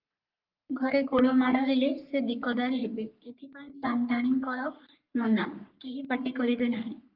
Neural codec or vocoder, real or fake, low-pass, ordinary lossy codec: codec, 44.1 kHz, 3.4 kbps, Pupu-Codec; fake; 5.4 kHz; Opus, 32 kbps